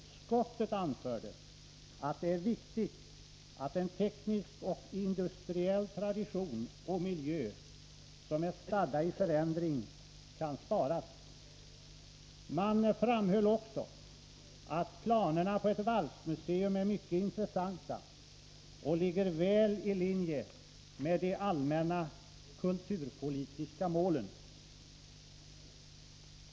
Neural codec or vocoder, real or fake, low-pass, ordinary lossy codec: none; real; none; none